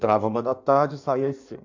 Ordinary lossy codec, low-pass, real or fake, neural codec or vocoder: MP3, 64 kbps; 7.2 kHz; fake; codec, 16 kHz in and 24 kHz out, 1.1 kbps, FireRedTTS-2 codec